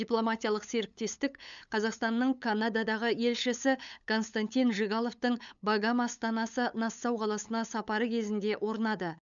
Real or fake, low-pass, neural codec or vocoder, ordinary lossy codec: fake; 7.2 kHz; codec, 16 kHz, 8 kbps, FunCodec, trained on LibriTTS, 25 frames a second; none